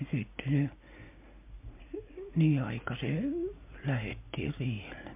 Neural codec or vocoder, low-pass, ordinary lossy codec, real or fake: none; 3.6 kHz; MP3, 32 kbps; real